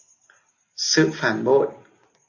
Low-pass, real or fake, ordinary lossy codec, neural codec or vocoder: 7.2 kHz; real; AAC, 48 kbps; none